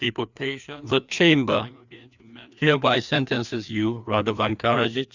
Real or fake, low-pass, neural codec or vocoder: fake; 7.2 kHz; codec, 16 kHz, 2 kbps, FreqCodec, larger model